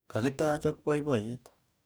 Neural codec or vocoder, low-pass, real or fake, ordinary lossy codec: codec, 44.1 kHz, 2.6 kbps, DAC; none; fake; none